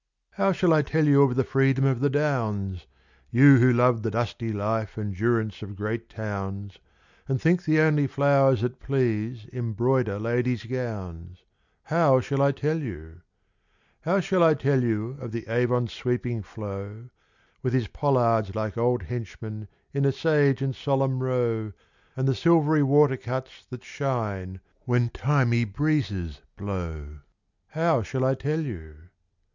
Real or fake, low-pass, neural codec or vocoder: real; 7.2 kHz; none